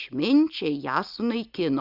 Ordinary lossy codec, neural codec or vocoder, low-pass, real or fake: Opus, 64 kbps; none; 5.4 kHz; real